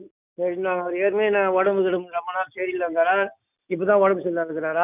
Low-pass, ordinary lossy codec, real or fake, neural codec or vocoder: 3.6 kHz; none; real; none